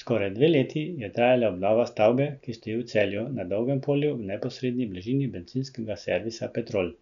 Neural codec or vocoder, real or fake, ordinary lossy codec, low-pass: none; real; none; 7.2 kHz